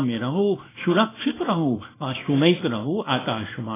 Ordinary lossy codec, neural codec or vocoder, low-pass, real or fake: AAC, 16 kbps; codec, 24 kHz, 0.9 kbps, WavTokenizer, small release; 3.6 kHz; fake